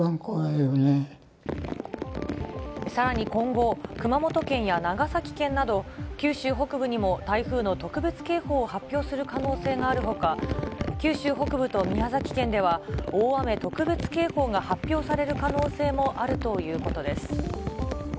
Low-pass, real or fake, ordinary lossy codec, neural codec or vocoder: none; real; none; none